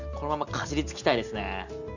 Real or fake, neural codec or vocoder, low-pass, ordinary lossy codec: real; none; 7.2 kHz; none